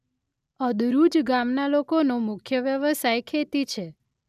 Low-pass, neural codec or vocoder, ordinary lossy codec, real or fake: 14.4 kHz; none; none; real